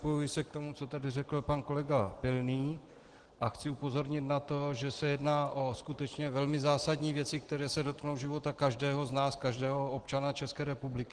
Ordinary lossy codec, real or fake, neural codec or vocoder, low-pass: Opus, 16 kbps; real; none; 9.9 kHz